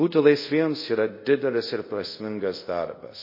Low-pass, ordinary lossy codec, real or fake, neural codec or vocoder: 5.4 kHz; MP3, 24 kbps; fake; codec, 24 kHz, 0.5 kbps, DualCodec